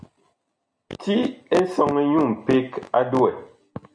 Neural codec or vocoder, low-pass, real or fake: none; 9.9 kHz; real